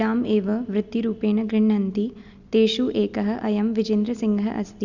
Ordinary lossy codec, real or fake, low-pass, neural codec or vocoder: none; real; 7.2 kHz; none